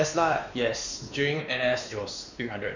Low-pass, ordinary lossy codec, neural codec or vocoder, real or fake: 7.2 kHz; none; codec, 16 kHz, 2 kbps, X-Codec, WavLM features, trained on Multilingual LibriSpeech; fake